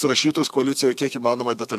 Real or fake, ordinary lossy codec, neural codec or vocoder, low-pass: fake; MP3, 96 kbps; codec, 32 kHz, 1.9 kbps, SNAC; 14.4 kHz